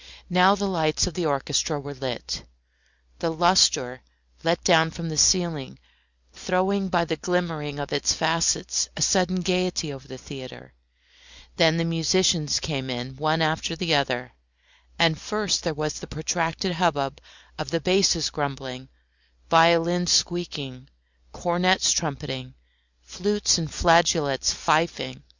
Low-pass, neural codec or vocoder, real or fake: 7.2 kHz; none; real